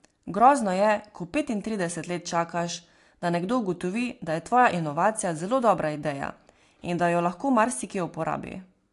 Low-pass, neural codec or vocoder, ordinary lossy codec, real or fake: 10.8 kHz; none; AAC, 48 kbps; real